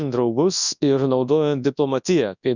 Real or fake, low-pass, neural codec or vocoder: fake; 7.2 kHz; codec, 24 kHz, 0.9 kbps, WavTokenizer, large speech release